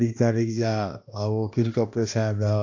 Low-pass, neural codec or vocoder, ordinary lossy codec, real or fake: 7.2 kHz; codec, 16 kHz, 1 kbps, X-Codec, HuBERT features, trained on balanced general audio; AAC, 48 kbps; fake